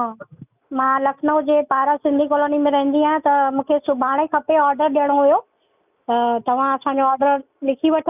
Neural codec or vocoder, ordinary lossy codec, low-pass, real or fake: none; none; 3.6 kHz; real